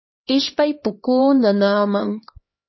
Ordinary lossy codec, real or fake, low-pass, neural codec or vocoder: MP3, 24 kbps; fake; 7.2 kHz; codec, 16 kHz, 2 kbps, X-Codec, HuBERT features, trained on LibriSpeech